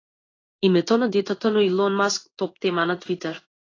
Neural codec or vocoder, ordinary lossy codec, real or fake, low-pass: codec, 16 kHz in and 24 kHz out, 1 kbps, XY-Tokenizer; AAC, 32 kbps; fake; 7.2 kHz